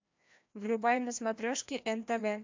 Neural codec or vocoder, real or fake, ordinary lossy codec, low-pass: codec, 16 kHz, 1 kbps, FreqCodec, larger model; fake; MP3, 64 kbps; 7.2 kHz